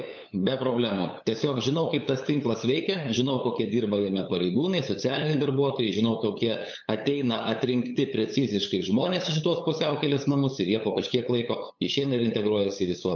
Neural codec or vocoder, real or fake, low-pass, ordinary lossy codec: codec, 16 kHz, 8 kbps, FunCodec, trained on LibriTTS, 25 frames a second; fake; 7.2 kHz; MP3, 64 kbps